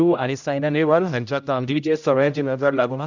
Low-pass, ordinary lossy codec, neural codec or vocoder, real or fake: 7.2 kHz; none; codec, 16 kHz, 0.5 kbps, X-Codec, HuBERT features, trained on general audio; fake